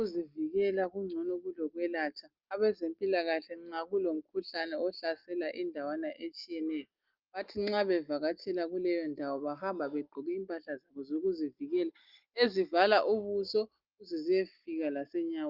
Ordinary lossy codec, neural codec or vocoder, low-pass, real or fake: Opus, 32 kbps; none; 5.4 kHz; real